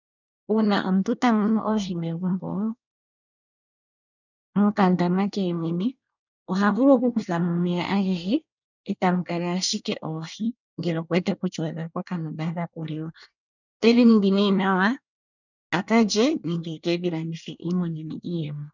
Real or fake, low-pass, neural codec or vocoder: fake; 7.2 kHz; codec, 24 kHz, 1 kbps, SNAC